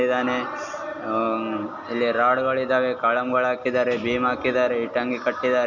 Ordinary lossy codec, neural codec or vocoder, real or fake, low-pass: none; none; real; 7.2 kHz